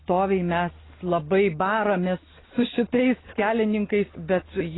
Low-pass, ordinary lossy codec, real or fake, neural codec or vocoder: 7.2 kHz; AAC, 16 kbps; real; none